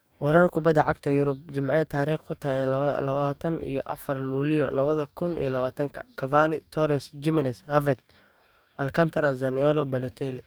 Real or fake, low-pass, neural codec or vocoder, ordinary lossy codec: fake; none; codec, 44.1 kHz, 2.6 kbps, DAC; none